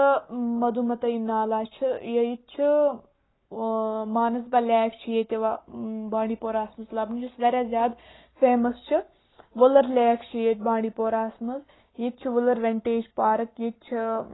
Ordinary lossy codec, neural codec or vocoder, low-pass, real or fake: AAC, 16 kbps; codec, 44.1 kHz, 7.8 kbps, Pupu-Codec; 7.2 kHz; fake